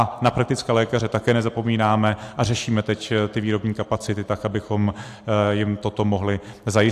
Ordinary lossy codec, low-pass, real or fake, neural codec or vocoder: AAC, 64 kbps; 14.4 kHz; real; none